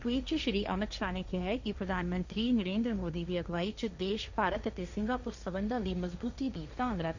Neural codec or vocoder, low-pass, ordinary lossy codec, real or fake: codec, 16 kHz, 1.1 kbps, Voila-Tokenizer; 7.2 kHz; none; fake